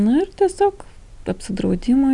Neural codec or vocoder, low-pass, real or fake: none; 10.8 kHz; real